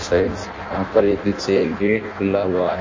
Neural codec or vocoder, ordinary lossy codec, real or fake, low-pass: codec, 16 kHz in and 24 kHz out, 0.6 kbps, FireRedTTS-2 codec; MP3, 32 kbps; fake; 7.2 kHz